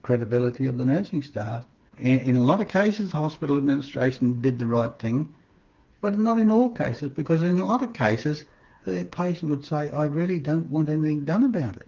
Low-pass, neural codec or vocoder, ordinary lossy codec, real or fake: 7.2 kHz; codec, 16 kHz, 4 kbps, FreqCodec, smaller model; Opus, 32 kbps; fake